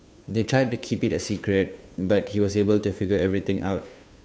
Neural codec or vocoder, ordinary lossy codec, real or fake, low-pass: codec, 16 kHz, 2 kbps, FunCodec, trained on Chinese and English, 25 frames a second; none; fake; none